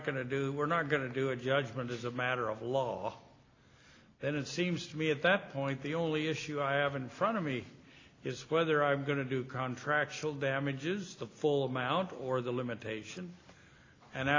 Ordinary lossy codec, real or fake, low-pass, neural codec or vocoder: AAC, 32 kbps; real; 7.2 kHz; none